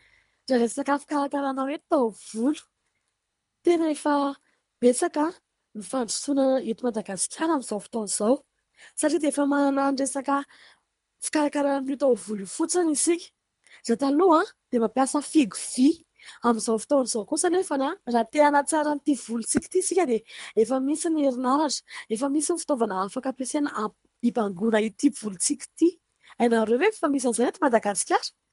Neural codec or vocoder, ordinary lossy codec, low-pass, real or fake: codec, 24 kHz, 3 kbps, HILCodec; MP3, 64 kbps; 10.8 kHz; fake